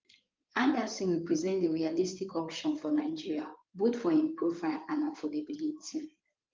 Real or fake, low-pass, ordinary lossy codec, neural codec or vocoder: fake; 7.2 kHz; Opus, 32 kbps; codec, 16 kHz in and 24 kHz out, 2.2 kbps, FireRedTTS-2 codec